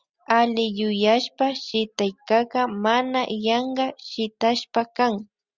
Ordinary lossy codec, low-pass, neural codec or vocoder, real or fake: Opus, 64 kbps; 7.2 kHz; none; real